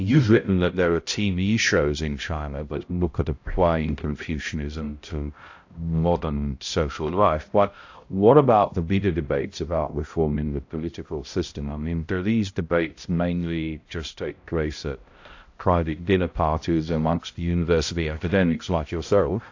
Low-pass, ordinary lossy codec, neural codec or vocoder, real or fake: 7.2 kHz; AAC, 48 kbps; codec, 16 kHz, 0.5 kbps, X-Codec, HuBERT features, trained on balanced general audio; fake